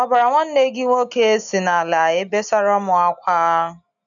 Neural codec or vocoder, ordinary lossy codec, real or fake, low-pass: none; none; real; 7.2 kHz